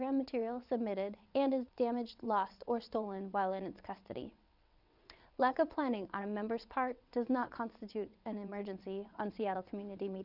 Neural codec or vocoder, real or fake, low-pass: vocoder, 22.05 kHz, 80 mel bands, WaveNeXt; fake; 5.4 kHz